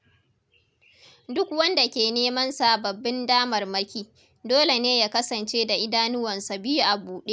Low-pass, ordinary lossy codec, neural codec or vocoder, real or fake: none; none; none; real